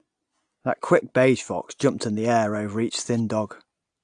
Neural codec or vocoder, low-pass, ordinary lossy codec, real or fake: none; 9.9 kHz; AAC, 64 kbps; real